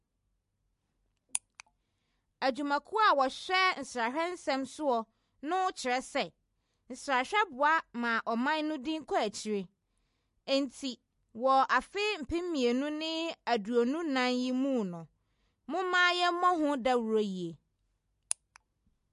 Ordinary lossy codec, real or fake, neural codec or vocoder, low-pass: MP3, 48 kbps; real; none; 14.4 kHz